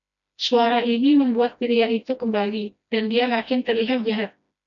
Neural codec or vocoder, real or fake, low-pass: codec, 16 kHz, 1 kbps, FreqCodec, smaller model; fake; 7.2 kHz